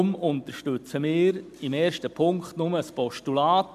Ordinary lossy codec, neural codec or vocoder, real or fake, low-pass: MP3, 96 kbps; none; real; 14.4 kHz